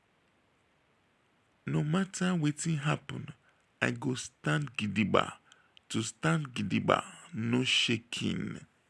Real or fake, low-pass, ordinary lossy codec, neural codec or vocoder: real; none; none; none